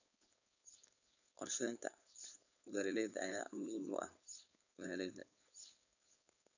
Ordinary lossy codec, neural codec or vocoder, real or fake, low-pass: none; codec, 16 kHz, 4.8 kbps, FACodec; fake; 7.2 kHz